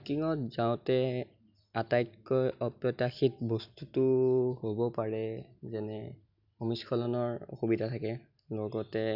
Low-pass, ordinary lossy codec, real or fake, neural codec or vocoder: 5.4 kHz; none; real; none